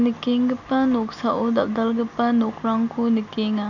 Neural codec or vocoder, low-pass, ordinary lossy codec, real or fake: none; 7.2 kHz; none; real